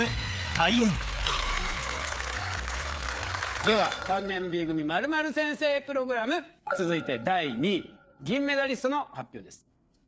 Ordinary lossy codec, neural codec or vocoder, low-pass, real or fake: none; codec, 16 kHz, 4 kbps, FreqCodec, larger model; none; fake